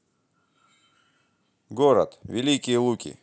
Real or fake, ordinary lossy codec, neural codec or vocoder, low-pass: real; none; none; none